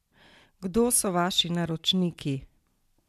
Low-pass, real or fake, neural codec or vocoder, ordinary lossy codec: 14.4 kHz; real; none; MP3, 96 kbps